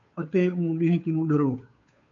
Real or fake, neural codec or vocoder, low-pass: fake; codec, 16 kHz, 2 kbps, FunCodec, trained on Chinese and English, 25 frames a second; 7.2 kHz